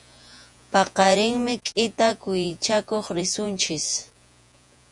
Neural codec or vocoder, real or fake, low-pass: vocoder, 48 kHz, 128 mel bands, Vocos; fake; 10.8 kHz